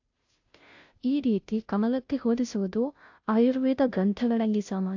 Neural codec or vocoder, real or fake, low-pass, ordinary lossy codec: codec, 16 kHz, 0.5 kbps, FunCodec, trained on Chinese and English, 25 frames a second; fake; 7.2 kHz; AAC, 48 kbps